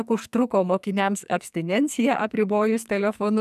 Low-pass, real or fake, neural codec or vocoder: 14.4 kHz; fake; codec, 44.1 kHz, 2.6 kbps, SNAC